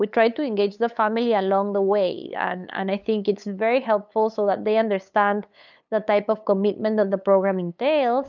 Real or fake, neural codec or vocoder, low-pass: fake; codec, 16 kHz, 8 kbps, FunCodec, trained on LibriTTS, 25 frames a second; 7.2 kHz